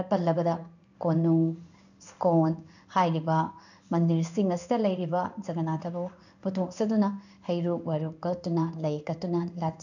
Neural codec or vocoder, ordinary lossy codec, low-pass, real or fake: codec, 16 kHz in and 24 kHz out, 1 kbps, XY-Tokenizer; none; 7.2 kHz; fake